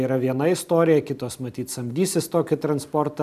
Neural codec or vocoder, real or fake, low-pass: none; real; 14.4 kHz